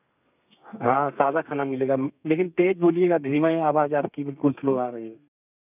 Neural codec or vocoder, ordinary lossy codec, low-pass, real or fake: codec, 32 kHz, 1.9 kbps, SNAC; none; 3.6 kHz; fake